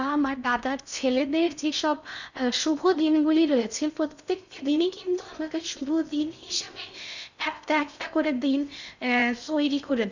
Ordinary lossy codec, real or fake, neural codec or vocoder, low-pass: none; fake; codec, 16 kHz in and 24 kHz out, 0.6 kbps, FocalCodec, streaming, 4096 codes; 7.2 kHz